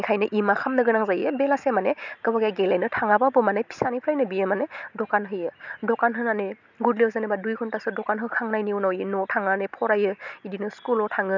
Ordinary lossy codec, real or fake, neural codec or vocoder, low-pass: none; real; none; 7.2 kHz